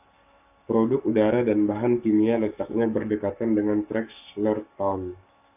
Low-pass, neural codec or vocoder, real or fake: 3.6 kHz; codec, 44.1 kHz, 7.8 kbps, Pupu-Codec; fake